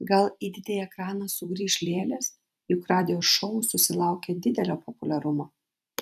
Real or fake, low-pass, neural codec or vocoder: fake; 14.4 kHz; vocoder, 44.1 kHz, 128 mel bands every 256 samples, BigVGAN v2